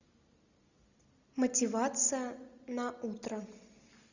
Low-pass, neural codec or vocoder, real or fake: 7.2 kHz; none; real